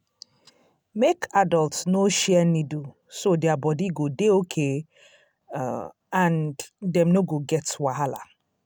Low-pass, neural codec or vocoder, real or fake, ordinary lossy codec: none; none; real; none